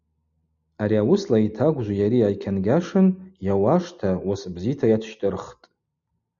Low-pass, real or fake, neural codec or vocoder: 7.2 kHz; real; none